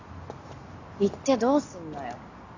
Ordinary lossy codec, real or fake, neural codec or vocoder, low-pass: AAC, 48 kbps; real; none; 7.2 kHz